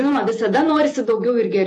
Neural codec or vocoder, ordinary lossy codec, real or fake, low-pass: vocoder, 48 kHz, 128 mel bands, Vocos; AAC, 48 kbps; fake; 10.8 kHz